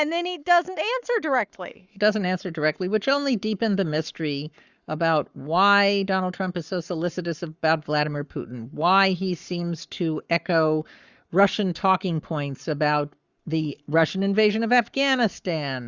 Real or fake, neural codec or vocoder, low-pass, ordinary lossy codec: fake; codec, 44.1 kHz, 7.8 kbps, Pupu-Codec; 7.2 kHz; Opus, 64 kbps